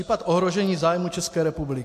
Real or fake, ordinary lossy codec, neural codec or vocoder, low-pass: real; AAC, 64 kbps; none; 14.4 kHz